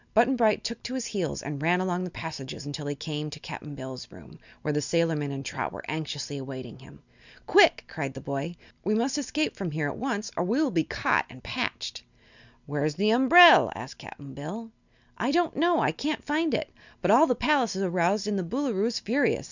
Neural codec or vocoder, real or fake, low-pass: none; real; 7.2 kHz